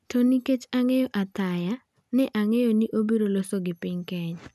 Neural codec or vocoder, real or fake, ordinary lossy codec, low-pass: none; real; none; 14.4 kHz